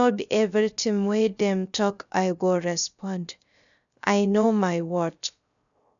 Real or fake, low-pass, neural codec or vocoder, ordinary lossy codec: fake; 7.2 kHz; codec, 16 kHz, 0.3 kbps, FocalCodec; none